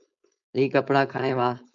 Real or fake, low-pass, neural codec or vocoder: fake; 7.2 kHz; codec, 16 kHz, 4.8 kbps, FACodec